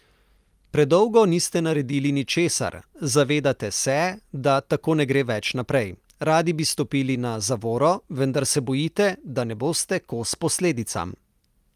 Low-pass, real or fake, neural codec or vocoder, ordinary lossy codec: 14.4 kHz; real; none; Opus, 32 kbps